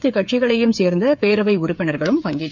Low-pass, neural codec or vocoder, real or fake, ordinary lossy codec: 7.2 kHz; codec, 16 kHz, 8 kbps, FreqCodec, smaller model; fake; none